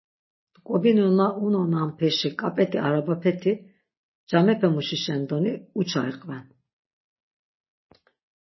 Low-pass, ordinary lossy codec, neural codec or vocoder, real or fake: 7.2 kHz; MP3, 24 kbps; none; real